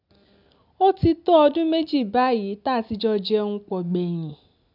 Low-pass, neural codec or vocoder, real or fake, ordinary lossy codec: 5.4 kHz; none; real; none